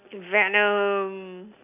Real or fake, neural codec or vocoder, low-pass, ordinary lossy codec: real; none; 3.6 kHz; none